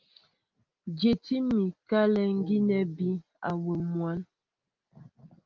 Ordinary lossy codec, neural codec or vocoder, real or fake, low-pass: Opus, 32 kbps; none; real; 7.2 kHz